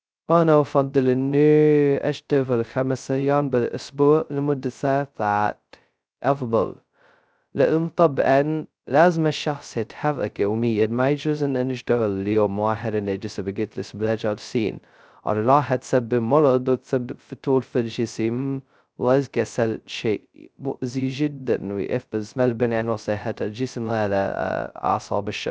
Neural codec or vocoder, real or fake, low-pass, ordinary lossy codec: codec, 16 kHz, 0.2 kbps, FocalCodec; fake; none; none